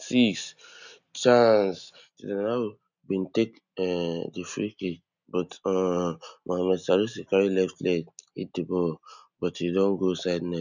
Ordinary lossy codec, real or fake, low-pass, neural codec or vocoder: none; real; 7.2 kHz; none